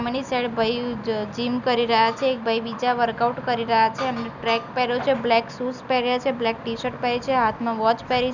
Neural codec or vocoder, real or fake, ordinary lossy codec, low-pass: none; real; none; 7.2 kHz